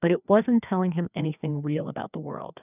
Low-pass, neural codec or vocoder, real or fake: 3.6 kHz; codec, 16 kHz, 2 kbps, FreqCodec, larger model; fake